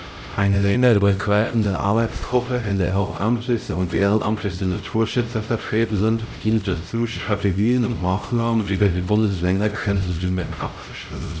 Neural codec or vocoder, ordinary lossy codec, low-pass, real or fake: codec, 16 kHz, 0.5 kbps, X-Codec, HuBERT features, trained on LibriSpeech; none; none; fake